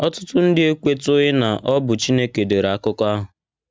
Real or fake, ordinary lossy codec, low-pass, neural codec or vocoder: real; none; none; none